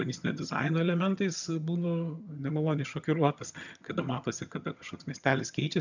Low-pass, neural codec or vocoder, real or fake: 7.2 kHz; vocoder, 22.05 kHz, 80 mel bands, HiFi-GAN; fake